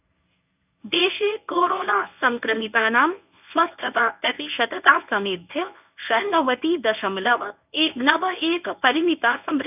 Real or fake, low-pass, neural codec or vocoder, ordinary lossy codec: fake; 3.6 kHz; codec, 24 kHz, 0.9 kbps, WavTokenizer, medium speech release version 1; none